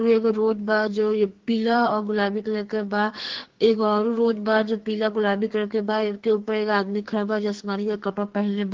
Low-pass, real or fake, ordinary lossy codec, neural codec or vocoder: 7.2 kHz; fake; Opus, 16 kbps; codec, 44.1 kHz, 2.6 kbps, SNAC